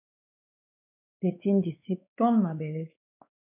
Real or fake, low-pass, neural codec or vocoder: fake; 3.6 kHz; codec, 16 kHz, 2 kbps, X-Codec, WavLM features, trained on Multilingual LibriSpeech